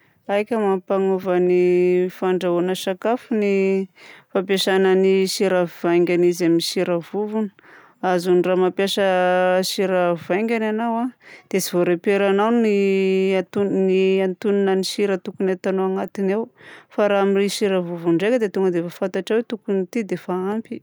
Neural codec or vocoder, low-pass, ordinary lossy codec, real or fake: none; none; none; real